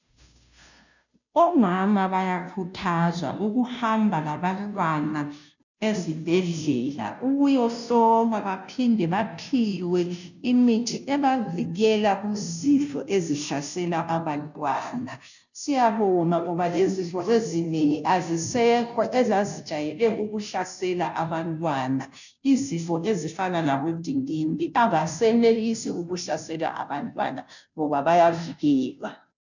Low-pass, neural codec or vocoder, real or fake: 7.2 kHz; codec, 16 kHz, 0.5 kbps, FunCodec, trained on Chinese and English, 25 frames a second; fake